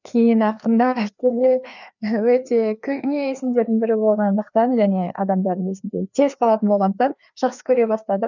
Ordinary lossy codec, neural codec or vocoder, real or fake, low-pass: none; codec, 16 kHz, 2 kbps, FreqCodec, larger model; fake; 7.2 kHz